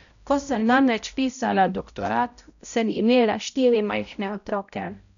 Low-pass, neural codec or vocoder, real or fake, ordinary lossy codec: 7.2 kHz; codec, 16 kHz, 0.5 kbps, X-Codec, HuBERT features, trained on balanced general audio; fake; none